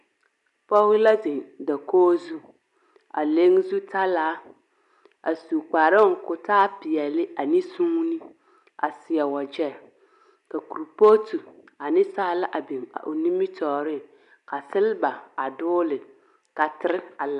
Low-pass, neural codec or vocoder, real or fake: 10.8 kHz; none; real